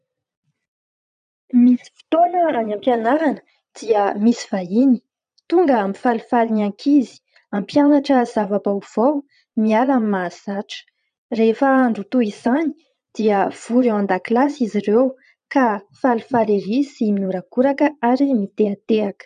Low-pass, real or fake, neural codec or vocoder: 9.9 kHz; fake; vocoder, 22.05 kHz, 80 mel bands, Vocos